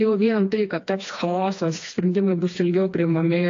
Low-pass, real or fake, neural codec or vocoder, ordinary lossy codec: 7.2 kHz; fake; codec, 16 kHz, 2 kbps, FreqCodec, smaller model; AAC, 48 kbps